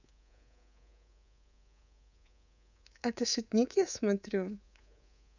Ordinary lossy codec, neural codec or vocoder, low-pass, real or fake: none; codec, 24 kHz, 3.1 kbps, DualCodec; 7.2 kHz; fake